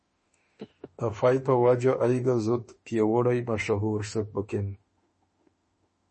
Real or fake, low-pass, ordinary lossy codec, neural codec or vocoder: fake; 10.8 kHz; MP3, 32 kbps; autoencoder, 48 kHz, 32 numbers a frame, DAC-VAE, trained on Japanese speech